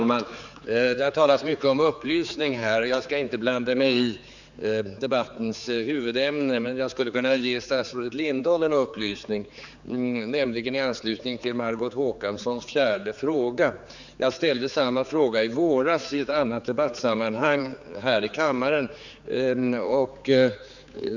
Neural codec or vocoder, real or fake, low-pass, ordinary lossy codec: codec, 16 kHz, 4 kbps, X-Codec, HuBERT features, trained on general audio; fake; 7.2 kHz; none